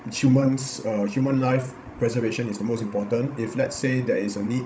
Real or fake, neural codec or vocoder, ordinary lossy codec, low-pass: fake; codec, 16 kHz, 16 kbps, FreqCodec, larger model; none; none